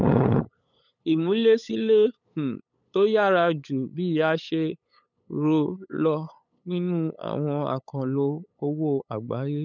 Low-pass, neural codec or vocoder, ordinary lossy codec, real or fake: 7.2 kHz; codec, 16 kHz, 8 kbps, FunCodec, trained on LibriTTS, 25 frames a second; none; fake